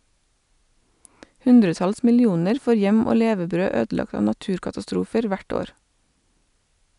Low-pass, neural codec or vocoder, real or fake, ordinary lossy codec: 10.8 kHz; none; real; none